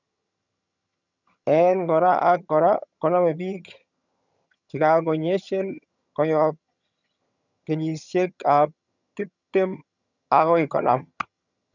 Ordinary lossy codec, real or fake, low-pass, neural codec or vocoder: none; fake; 7.2 kHz; vocoder, 22.05 kHz, 80 mel bands, HiFi-GAN